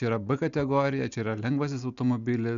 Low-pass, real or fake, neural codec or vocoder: 7.2 kHz; real; none